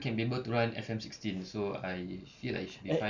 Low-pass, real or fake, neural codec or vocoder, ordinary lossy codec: 7.2 kHz; real; none; none